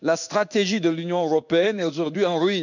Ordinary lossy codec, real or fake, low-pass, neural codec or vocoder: none; fake; 7.2 kHz; codec, 16 kHz in and 24 kHz out, 1 kbps, XY-Tokenizer